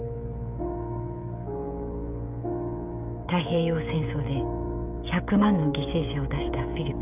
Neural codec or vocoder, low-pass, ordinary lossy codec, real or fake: codec, 16 kHz, 16 kbps, FreqCodec, smaller model; 3.6 kHz; none; fake